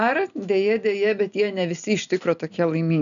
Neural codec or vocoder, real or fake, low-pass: none; real; 7.2 kHz